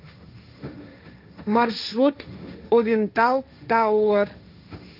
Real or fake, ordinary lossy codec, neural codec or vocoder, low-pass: fake; AAC, 48 kbps; codec, 16 kHz, 1.1 kbps, Voila-Tokenizer; 5.4 kHz